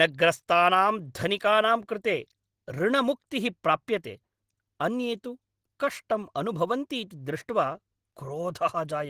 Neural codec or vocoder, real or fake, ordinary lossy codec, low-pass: none; real; Opus, 16 kbps; 14.4 kHz